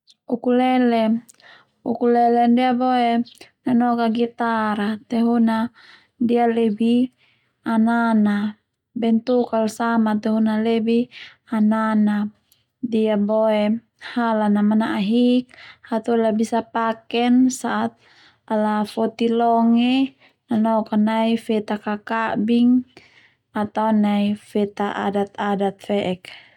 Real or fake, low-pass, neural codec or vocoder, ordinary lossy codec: real; 19.8 kHz; none; none